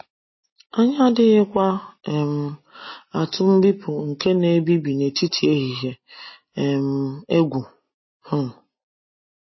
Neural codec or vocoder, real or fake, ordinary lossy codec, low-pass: none; real; MP3, 24 kbps; 7.2 kHz